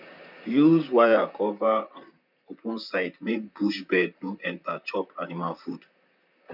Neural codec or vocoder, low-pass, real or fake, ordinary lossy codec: vocoder, 44.1 kHz, 128 mel bands, Pupu-Vocoder; 5.4 kHz; fake; none